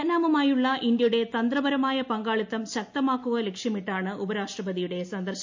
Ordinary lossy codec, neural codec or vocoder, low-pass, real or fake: MP3, 48 kbps; none; 7.2 kHz; real